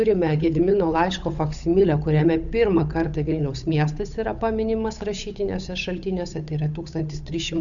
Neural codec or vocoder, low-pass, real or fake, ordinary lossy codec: codec, 16 kHz, 8 kbps, FunCodec, trained on Chinese and English, 25 frames a second; 7.2 kHz; fake; MP3, 96 kbps